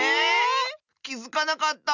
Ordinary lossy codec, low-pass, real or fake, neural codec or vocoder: none; 7.2 kHz; real; none